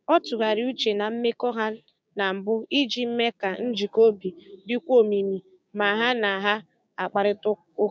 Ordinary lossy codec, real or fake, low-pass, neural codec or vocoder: none; fake; none; codec, 16 kHz, 6 kbps, DAC